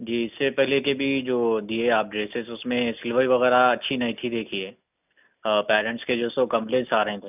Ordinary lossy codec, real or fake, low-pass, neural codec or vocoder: none; real; 3.6 kHz; none